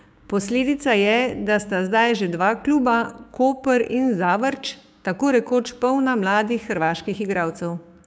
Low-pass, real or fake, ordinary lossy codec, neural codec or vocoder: none; fake; none; codec, 16 kHz, 6 kbps, DAC